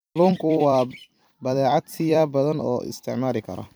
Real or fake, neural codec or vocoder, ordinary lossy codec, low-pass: fake; vocoder, 44.1 kHz, 128 mel bands every 256 samples, BigVGAN v2; none; none